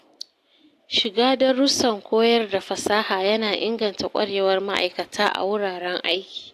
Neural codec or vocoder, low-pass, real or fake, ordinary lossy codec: none; 14.4 kHz; real; AAC, 64 kbps